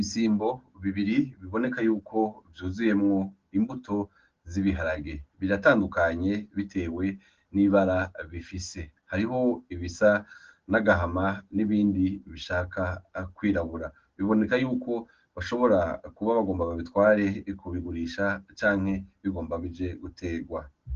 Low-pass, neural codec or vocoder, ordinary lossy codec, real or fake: 7.2 kHz; none; Opus, 16 kbps; real